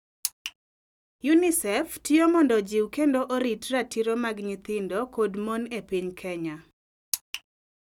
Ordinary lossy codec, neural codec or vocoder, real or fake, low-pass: none; none; real; 19.8 kHz